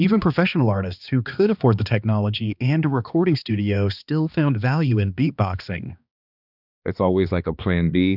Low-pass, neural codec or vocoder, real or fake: 5.4 kHz; codec, 16 kHz, 2 kbps, X-Codec, HuBERT features, trained on balanced general audio; fake